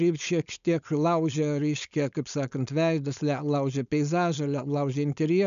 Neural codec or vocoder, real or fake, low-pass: codec, 16 kHz, 4.8 kbps, FACodec; fake; 7.2 kHz